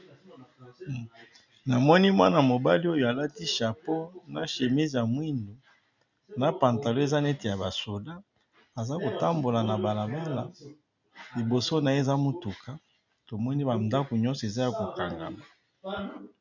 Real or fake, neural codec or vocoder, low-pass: real; none; 7.2 kHz